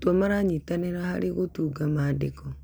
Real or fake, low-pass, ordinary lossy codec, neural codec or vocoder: real; none; none; none